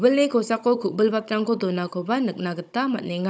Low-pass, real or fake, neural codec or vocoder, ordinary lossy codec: none; fake; codec, 16 kHz, 16 kbps, FunCodec, trained on Chinese and English, 50 frames a second; none